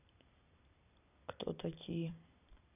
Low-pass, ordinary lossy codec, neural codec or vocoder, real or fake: 3.6 kHz; none; vocoder, 44.1 kHz, 128 mel bands every 256 samples, BigVGAN v2; fake